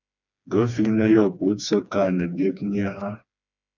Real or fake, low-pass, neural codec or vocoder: fake; 7.2 kHz; codec, 16 kHz, 2 kbps, FreqCodec, smaller model